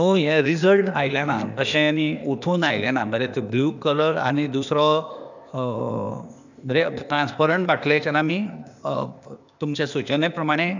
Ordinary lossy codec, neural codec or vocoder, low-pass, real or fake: none; codec, 16 kHz, 0.8 kbps, ZipCodec; 7.2 kHz; fake